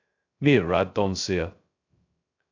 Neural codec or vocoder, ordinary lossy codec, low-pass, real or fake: codec, 16 kHz, 0.2 kbps, FocalCodec; AAC, 48 kbps; 7.2 kHz; fake